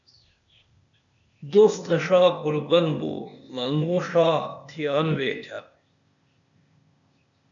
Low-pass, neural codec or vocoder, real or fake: 7.2 kHz; codec, 16 kHz, 0.8 kbps, ZipCodec; fake